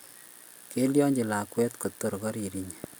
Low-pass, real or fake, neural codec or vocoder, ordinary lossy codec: none; real; none; none